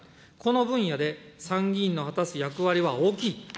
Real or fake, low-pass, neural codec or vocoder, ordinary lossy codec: real; none; none; none